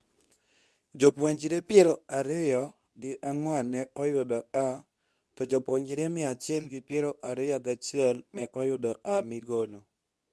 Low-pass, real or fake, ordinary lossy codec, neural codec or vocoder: none; fake; none; codec, 24 kHz, 0.9 kbps, WavTokenizer, medium speech release version 2